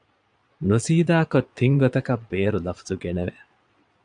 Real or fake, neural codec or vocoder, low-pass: fake; vocoder, 22.05 kHz, 80 mel bands, Vocos; 9.9 kHz